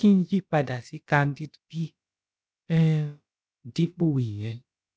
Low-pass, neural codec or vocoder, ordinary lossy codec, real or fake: none; codec, 16 kHz, about 1 kbps, DyCAST, with the encoder's durations; none; fake